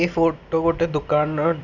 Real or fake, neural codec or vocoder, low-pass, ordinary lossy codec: real; none; 7.2 kHz; none